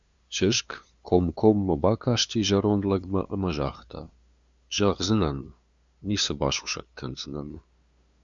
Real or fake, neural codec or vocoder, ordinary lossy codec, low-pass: fake; codec, 16 kHz, 2 kbps, FunCodec, trained on LibriTTS, 25 frames a second; Opus, 64 kbps; 7.2 kHz